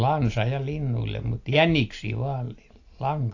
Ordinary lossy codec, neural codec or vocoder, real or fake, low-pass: AAC, 48 kbps; none; real; 7.2 kHz